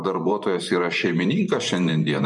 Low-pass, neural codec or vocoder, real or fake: 10.8 kHz; vocoder, 24 kHz, 100 mel bands, Vocos; fake